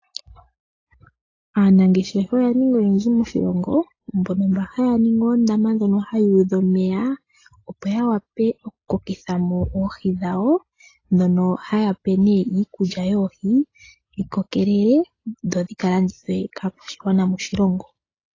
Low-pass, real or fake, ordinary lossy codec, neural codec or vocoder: 7.2 kHz; real; AAC, 32 kbps; none